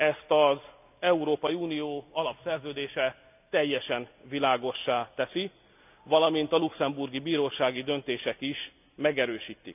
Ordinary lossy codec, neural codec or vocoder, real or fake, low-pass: none; none; real; 3.6 kHz